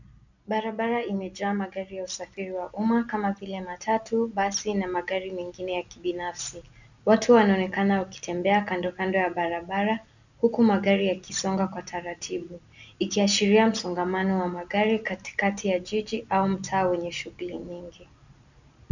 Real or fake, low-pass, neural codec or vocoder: real; 7.2 kHz; none